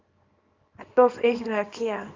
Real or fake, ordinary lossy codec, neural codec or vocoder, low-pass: fake; Opus, 24 kbps; codec, 24 kHz, 0.9 kbps, WavTokenizer, small release; 7.2 kHz